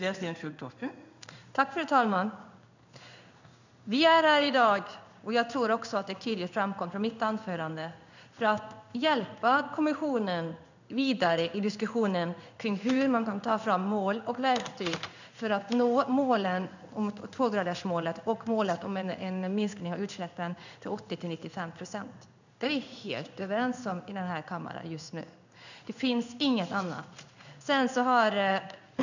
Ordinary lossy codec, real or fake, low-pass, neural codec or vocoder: none; fake; 7.2 kHz; codec, 16 kHz in and 24 kHz out, 1 kbps, XY-Tokenizer